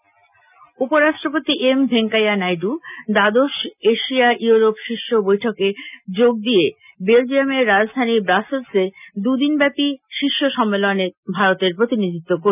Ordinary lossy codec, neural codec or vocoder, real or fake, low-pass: none; none; real; 3.6 kHz